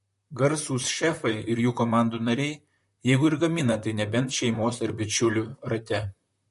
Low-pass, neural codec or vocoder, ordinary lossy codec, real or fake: 14.4 kHz; vocoder, 44.1 kHz, 128 mel bands, Pupu-Vocoder; MP3, 48 kbps; fake